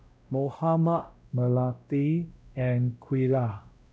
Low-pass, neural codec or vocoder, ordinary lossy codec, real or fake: none; codec, 16 kHz, 0.5 kbps, X-Codec, WavLM features, trained on Multilingual LibriSpeech; none; fake